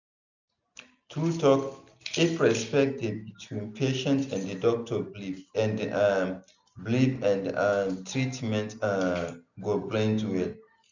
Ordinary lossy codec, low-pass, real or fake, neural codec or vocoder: none; 7.2 kHz; real; none